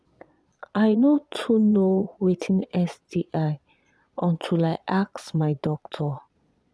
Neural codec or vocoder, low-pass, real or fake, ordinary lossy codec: vocoder, 22.05 kHz, 80 mel bands, Vocos; none; fake; none